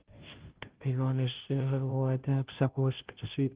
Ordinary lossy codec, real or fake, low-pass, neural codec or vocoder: Opus, 16 kbps; fake; 3.6 kHz; codec, 16 kHz, 0.5 kbps, FunCodec, trained on Chinese and English, 25 frames a second